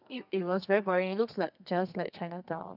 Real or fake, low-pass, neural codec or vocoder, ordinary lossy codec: fake; 5.4 kHz; codec, 32 kHz, 1.9 kbps, SNAC; none